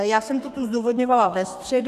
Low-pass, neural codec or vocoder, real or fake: 14.4 kHz; codec, 32 kHz, 1.9 kbps, SNAC; fake